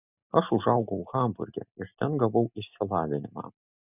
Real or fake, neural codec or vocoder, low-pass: real; none; 3.6 kHz